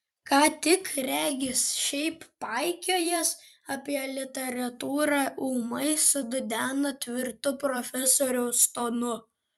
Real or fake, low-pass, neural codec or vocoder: fake; 19.8 kHz; vocoder, 44.1 kHz, 128 mel bands every 256 samples, BigVGAN v2